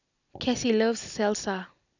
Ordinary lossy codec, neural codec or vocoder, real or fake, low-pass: none; none; real; 7.2 kHz